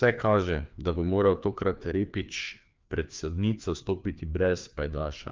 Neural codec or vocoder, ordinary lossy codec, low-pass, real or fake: codec, 16 kHz, 2 kbps, FreqCodec, larger model; Opus, 24 kbps; 7.2 kHz; fake